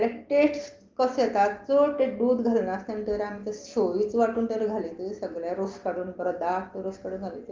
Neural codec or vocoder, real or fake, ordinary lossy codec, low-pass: none; real; Opus, 32 kbps; 7.2 kHz